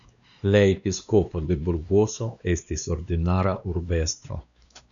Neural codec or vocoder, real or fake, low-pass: codec, 16 kHz, 2 kbps, X-Codec, WavLM features, trained on Multilingual LibriSpeech; fake; 7.2 kHz